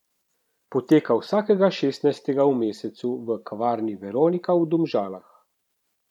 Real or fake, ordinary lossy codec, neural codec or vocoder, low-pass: fake; none; vocoder, 44.1 kHz, 128 mel bands every 512 samples, BigVGAN v2; 19.8 kHz